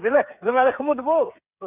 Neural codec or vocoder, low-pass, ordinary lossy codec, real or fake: codec, 16 kHz, 8 kbps, FreqCodec, smaller model; 3.6 kHz; none; fake